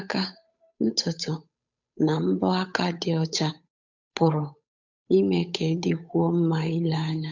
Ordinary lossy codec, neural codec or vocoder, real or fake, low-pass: none; codec, 16 kHz, 8 kbps, FunCodec, trained on Chinese and English, 25 frames a second; fake; 7.2 kHz